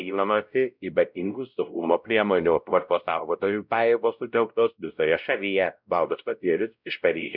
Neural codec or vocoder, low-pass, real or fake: codec, 16 kHz, 0.5 kbps, X-Codec, WavLM features, trained on Multilingual LibriSpeech; 5.4 kHz; fake